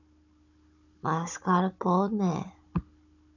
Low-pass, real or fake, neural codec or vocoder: 7.2 kHz; fake; codec, 16 kHz, 16 kbps, FunCodec, trained on Chinese and English, 50 frames a second